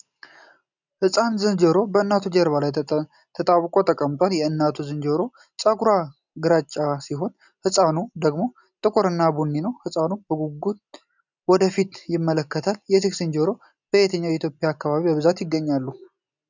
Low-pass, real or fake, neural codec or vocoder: 7.2 kHz; real; none